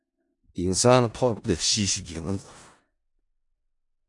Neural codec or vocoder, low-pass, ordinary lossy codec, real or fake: codec, 16 kHz in and 24 kHz out, 0.4 kbps, LongCat-Audio-Codec, four codebook decoder; 10.8 kHz; AAC, 64 kbps; fake